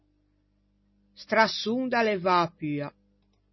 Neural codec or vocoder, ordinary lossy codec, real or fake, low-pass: none; MP3, 24 kbps; real; 7.2 kHz